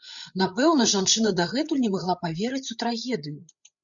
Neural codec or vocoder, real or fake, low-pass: codec, 16 kHz, 8 kbps, FreqCodec, larger model; fake; 7.2 kHz